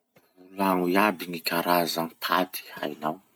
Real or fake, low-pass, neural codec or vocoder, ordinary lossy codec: real; none; none; none